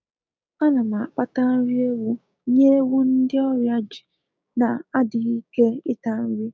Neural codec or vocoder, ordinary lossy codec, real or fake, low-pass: codec, 16 kHz, 6 kbps, DAC; none; fake; none